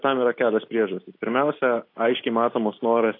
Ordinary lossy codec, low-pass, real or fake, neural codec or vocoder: AAC, 32 kbps; 5.4 kHz; real; none